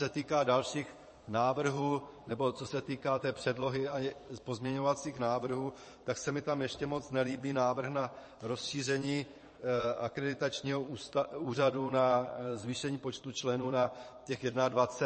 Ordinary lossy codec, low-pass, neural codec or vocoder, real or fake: MP3, 32 kbps; 9.9 kHz; vocoder, 22.05 kHz, 80 mel bands, Vocos; fake